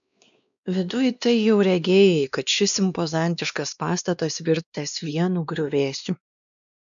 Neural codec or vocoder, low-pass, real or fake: codec, 16 kHz, 2 kbps, X-Codec, WavLM features, trained on Multilingual LibriSpeech; 7.2 kHz; fake